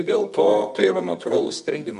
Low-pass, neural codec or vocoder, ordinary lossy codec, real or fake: 10.8 kHz; codec, 24 kHz, 0.9 kbps, WavTokenizer, medium music audio release; MP3, 64 kbps; fake